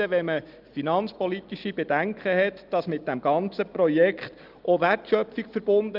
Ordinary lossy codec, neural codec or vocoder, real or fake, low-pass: Opus, 32 kbps; none; real; 5.4 kHz